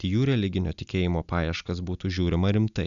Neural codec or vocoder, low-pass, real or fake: none; 7.2 kHz; real